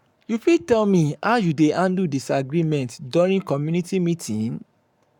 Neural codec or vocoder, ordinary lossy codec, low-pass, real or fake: codec, 44.1 kHz, 7.8 kbps, Pupu-Codec; none; 19.8 kHz; fake